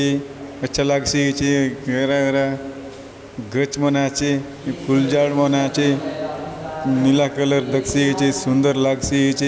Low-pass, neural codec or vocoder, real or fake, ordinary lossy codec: none; none; real; none